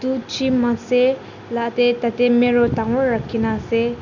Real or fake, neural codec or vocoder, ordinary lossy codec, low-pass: real; none; none; 7.2 kHz